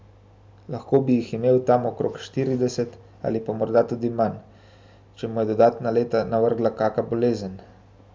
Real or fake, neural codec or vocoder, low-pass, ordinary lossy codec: real; none; none; none